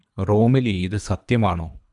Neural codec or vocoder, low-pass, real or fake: codec, 24 kHz, 3 kbps, HILCodec; 10.8 kHz; fake